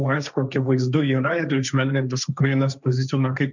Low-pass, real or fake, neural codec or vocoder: 7.2 kHz; fake; codec, 16 kHz, 1.1 kbps, Voila-Tokenizer